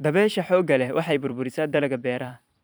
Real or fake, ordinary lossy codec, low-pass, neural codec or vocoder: fake; none; none; vocoder, 44.1 kHz, 128 mel bands every 256 samples, BigVGAN v2